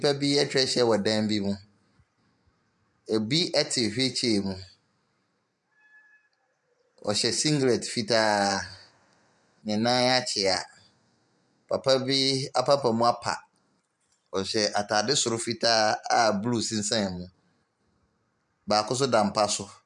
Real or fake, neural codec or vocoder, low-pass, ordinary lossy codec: fake; vocoder, 44.1 kHz, 128 mel bands every 512 samples, BigVGAN v2; 10.8 kHz; MP3, 96 kbps